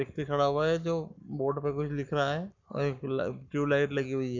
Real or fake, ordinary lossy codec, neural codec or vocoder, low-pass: fake; none; codec, 44.1 kHz, 7.8 kbps, Pupu-Codec; 7.2 kHz